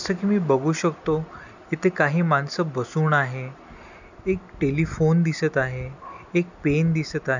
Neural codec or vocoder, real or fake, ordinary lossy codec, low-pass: none; real; none; 7.2 kHz